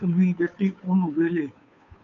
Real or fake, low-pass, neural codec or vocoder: fake; 7.2 kHz; codec, 16 kHz, 2 kbps, FunCodec, trained on Chinese and English, 25 frames a second